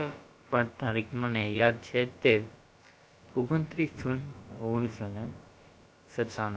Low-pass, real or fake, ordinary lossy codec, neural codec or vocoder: none; fake; none; codec, 16 kHz, about 1 kbps, DyCAST, with the encoder's durations